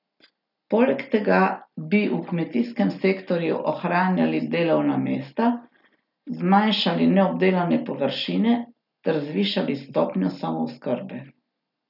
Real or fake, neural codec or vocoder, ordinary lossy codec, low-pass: real; none; none; 5.4 kHz